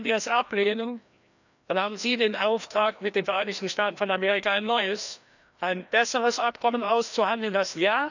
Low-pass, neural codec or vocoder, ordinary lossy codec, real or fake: 7.2 kHz; codec, 16 kHz, 1 kbps, FreqCodec, larger model; none; fake